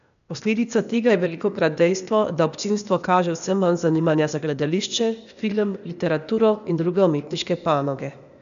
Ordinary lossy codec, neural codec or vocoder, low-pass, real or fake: none; codec, 16 kHz, 0.8 kbps, ZipCodec; 7.2 kHz; fake